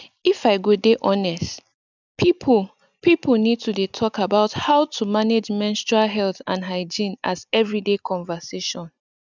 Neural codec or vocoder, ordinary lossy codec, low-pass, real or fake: none; none; 7.2 kHz; real